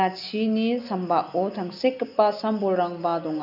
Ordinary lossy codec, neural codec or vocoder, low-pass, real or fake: none; none; 5.4 kHz; real